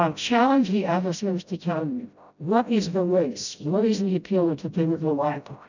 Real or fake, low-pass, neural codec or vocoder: fake; 7.2 kHz; codec, 16 kHz, 0.5 kbps, FreqCodec, smaller model